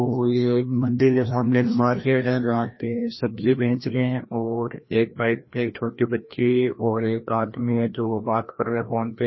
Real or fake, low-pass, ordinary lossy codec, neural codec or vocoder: fake; 7.2 kHz; MP3, 24 kbps; codec, 16 kHz, 1 kbps, FreqCodec, larger model